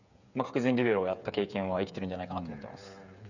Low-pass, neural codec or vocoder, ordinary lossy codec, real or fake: 7.2 kHz; codec, 16 kHz, 8 kbps, FreqCodec, smaller model; none; fake